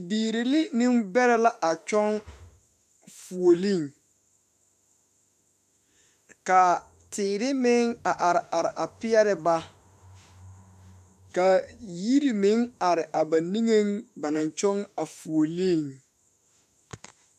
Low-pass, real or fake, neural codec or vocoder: 14.4 kHz; fake; autoencoder, 48 kHz, 32 numbers a frame, DAC-VAE, trained on Japanese speech